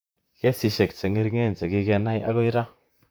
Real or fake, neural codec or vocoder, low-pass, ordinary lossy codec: fake; vocoder, 44.1 kHz, 128 mel bands, Pupu-Vocoder; none; none